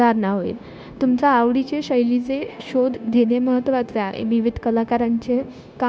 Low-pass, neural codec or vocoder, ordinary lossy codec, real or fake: none; codec, 16 kHz, 0.9 kbps, LongCat-Audio-Codec; none; fake